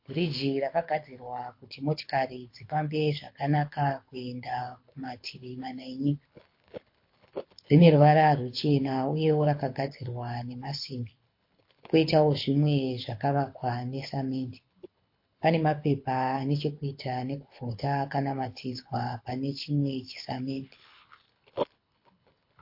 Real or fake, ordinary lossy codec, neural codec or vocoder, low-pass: fake; MP3, 32 kbps; codec, 24 kHz, 6 kbps, HILCodec; 5.4 kHz